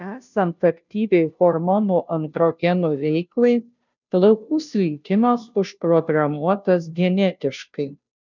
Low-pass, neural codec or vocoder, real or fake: 7.2 kHz; codec, 16 kHz, 0.5 kbps, FunCodec, trained on Chinese and English, 25 frames a second; fake